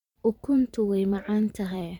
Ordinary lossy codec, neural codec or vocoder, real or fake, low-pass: MP3, 96 kbps; vocoder, 44.1 kHz, 128 mel bands every 512 samples, BigVGAN v2; fake; 19.8 kHz